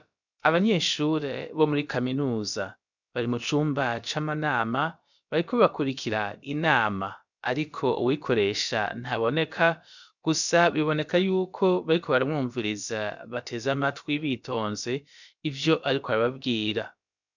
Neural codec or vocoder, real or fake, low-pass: codec, 16 kHz, about 1 kbps, DyCAST, with the encoder's durations; fake; 7.2 kHz